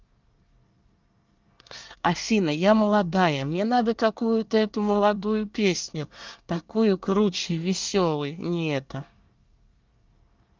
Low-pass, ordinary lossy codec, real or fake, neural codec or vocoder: 7.2 kHz; Opus, 24 kbps; fake; codec, 24 kHz, 1 kbps, SNAC